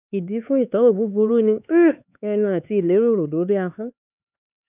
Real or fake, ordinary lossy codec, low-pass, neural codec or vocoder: fake; none; 3.6 kHz; codec, 16 kHz, 4 kbps, X-Codec, HuBERT features, trained on LibriSpeech